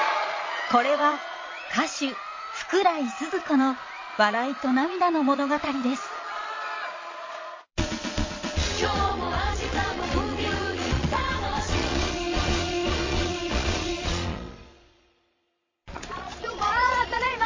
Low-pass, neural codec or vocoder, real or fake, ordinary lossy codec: 7.2 kHz; vocoder, 22.05 kHz, 80 mel bands, Vocos; fake; MP3, 32 kbps